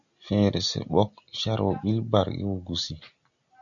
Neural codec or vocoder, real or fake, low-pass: none; real; 7.2 kHz